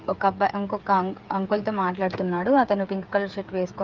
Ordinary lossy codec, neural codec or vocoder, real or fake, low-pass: Opus, 32 kbps; codec, 16 kHz, 8 kbps, FreqCodec, smaller model; fake; 7.2 kHz